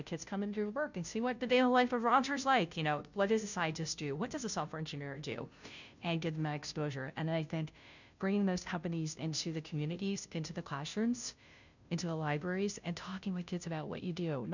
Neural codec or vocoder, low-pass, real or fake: codec, 16 kHz, 0.5 kbps, FunCodec, trained on Chinese and English, 25 frames a second; 7.2 kHz; fake